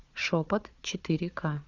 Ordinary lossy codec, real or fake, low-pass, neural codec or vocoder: Opus, 64 kbps; fake; 7.2 kHz; codec, 16 kHz, 4 kbps, FunCodec, trained on Chinese and English, 50 frames a second